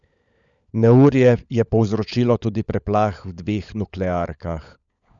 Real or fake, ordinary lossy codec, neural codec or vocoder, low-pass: fake; none; codec, 16 kHz, 16 kbps, FunCodec, trained on LibriTTS, 50 frames a second; 7.2 kHz